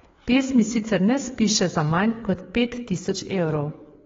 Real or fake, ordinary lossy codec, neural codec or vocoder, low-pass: fake; AAC, 24 kbps; codec, 16 kHz, 4 kbps, FreqCodec, larger model; 7.2 kHz